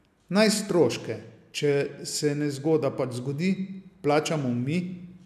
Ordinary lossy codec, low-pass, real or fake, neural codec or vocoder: none; 14.4 kHz; real; none